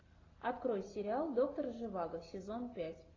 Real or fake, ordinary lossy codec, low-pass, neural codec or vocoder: real; AAC, 32 kbps; 7.2 kHz; none